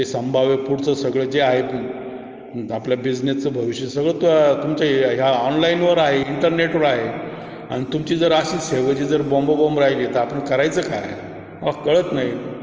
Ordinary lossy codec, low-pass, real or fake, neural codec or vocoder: Opus, 24 kbps; 7.2 kHz; real; none